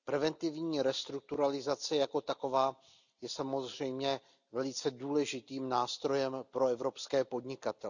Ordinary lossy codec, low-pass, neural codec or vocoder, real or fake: none; 7.2 kHz; none; real